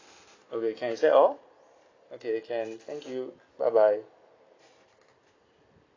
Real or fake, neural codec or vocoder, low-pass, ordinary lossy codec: real; none; 7.2 kHz; AAC, 32 kbps